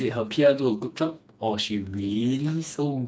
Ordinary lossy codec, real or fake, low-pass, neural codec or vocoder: none; fake; none; codec, 16 kHz, 2 kbps, FreqCodec, smaller model